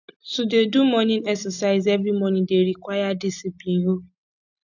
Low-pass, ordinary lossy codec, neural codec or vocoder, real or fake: 7.2 kHz; none; none; real